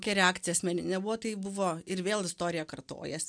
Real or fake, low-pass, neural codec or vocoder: real; 9.9 kHz; none